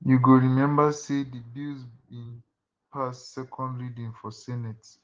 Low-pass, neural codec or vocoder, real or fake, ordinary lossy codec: 7.2 kHz; none; real; Opus, 16 kbps